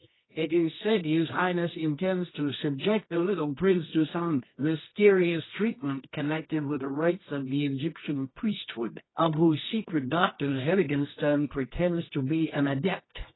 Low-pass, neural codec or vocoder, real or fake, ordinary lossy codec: 7.2 kHz; codec, 24 kHz, 0.9 kbps, WavTokenizer, medium music audio release; fake; AAC, 16 kbps